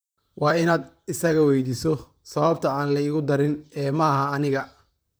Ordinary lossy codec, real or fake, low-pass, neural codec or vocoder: none; fake; none; vocoder, 44.1 kHz, 128 mel bands, Pupu-Vocoder